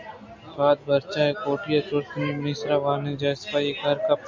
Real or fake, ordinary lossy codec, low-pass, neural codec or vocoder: real; MP3, 64 kbps; 7.2 kHz; none